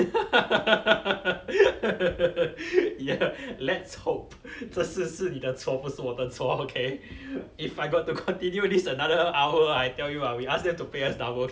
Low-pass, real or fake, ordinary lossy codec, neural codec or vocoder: none; real; none; none